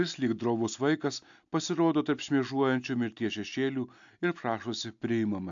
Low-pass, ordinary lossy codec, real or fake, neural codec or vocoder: 7.2 kHz; AAC, 64 kbps; real; none